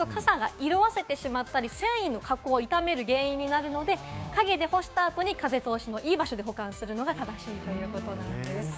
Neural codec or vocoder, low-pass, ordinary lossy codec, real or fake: codec, 16 kHz, 6 kbps, DAC; none; none; fake